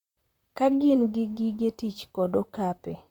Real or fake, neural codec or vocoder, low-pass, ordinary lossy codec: fake; vocoder, 44.1 kHz, 128 mel bands, Pupu-Vocoder; 19.8 kHz; Opus, 64 kbps